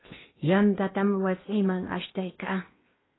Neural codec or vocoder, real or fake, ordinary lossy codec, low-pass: codec, 16 kHz in and 24 kHz out, 0.8 kbps, FocalCodec, streaming, 65536 codes; fake; AAC, 16 kbps; 7.2 kHz